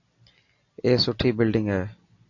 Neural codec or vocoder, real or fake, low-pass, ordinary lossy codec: none; real; 7.2 kHz; AAC, 48 kbps